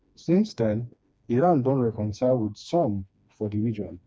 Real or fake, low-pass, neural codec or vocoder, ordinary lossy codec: fake; none; codec, 16 kHz, 2 kbps, FreqCodec, smaller model; none